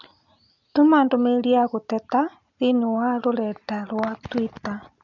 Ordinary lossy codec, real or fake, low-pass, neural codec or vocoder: none; real; 7.2 kHz; none